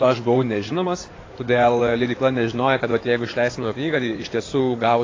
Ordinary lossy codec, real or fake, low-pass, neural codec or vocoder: AAC, 32 kbps; fake; 7.2 kHz; codec, 16 kHz in and 24 kHz out, 2.2 kbps, FireRedTTS-2 codec